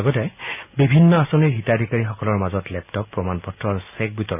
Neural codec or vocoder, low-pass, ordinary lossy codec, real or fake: none; 3.6 kHz; none; real